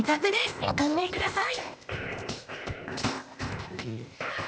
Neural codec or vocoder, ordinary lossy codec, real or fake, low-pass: codec, 16 kHz, 0.8 kbps, ZipCodec; none; fake; none